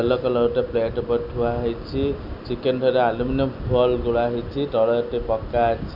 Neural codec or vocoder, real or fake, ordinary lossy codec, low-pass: none; real; none; 5.4 kHz